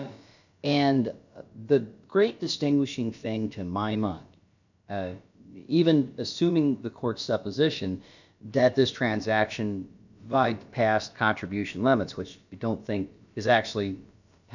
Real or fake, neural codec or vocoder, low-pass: fake; codec, 16 kHz, about 1 kbps, DyCAST, with the encoder's durations; 7.2 kHz